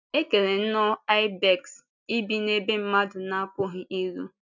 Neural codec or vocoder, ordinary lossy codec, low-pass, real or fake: none; none; 7.2 kHz; real